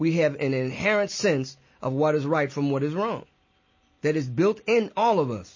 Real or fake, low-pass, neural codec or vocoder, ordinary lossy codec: real; 7.2 kHz; none; MP3, 32 kbps